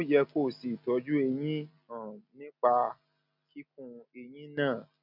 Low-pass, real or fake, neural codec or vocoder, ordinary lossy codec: 5.4 kHz; real; none; none